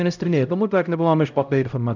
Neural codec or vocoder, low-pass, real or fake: codec, 16 kHz, 0.5 kbps, X-Codec, HuBERT features, trained on LibriSpeech; 7.2 kHz; fake